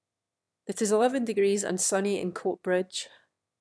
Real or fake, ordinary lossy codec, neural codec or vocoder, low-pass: fake; none; autoencoder, 22.05 kHz, a latent of 192 numbers a frame, VITS, trained on one speaker; none